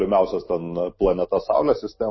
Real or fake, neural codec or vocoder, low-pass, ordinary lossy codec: real; none; 7.2 kHz; MP3, 24 kbps